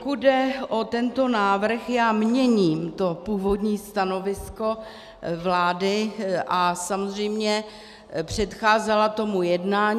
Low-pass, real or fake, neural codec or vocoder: 14.4 kHz; real; none